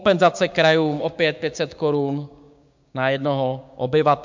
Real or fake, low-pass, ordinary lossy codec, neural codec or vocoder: fake; 7.2 kHz; MP3, 64 kbps; codec, 16 kHz, 6 kbps, DAC